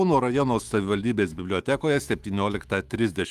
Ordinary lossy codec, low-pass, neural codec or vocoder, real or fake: Opus, 32 kbps; 14.4 kHz; autoencoder, 48 kHz, 128 numbers a frame, DAC-VAE, trained on Japanese speech; fake